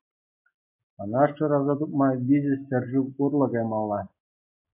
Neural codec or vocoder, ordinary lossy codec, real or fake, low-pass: none; MP3, 24 kbps; real; 3.6 kHz